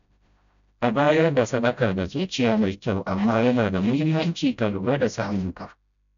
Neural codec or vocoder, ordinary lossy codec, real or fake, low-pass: codec, 16 kHz, 0.5 kbps, FreqCodec, smaller model; none; fake; 7.2 kHz